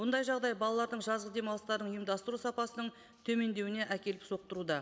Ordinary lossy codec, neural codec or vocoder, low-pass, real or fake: none; none; none; real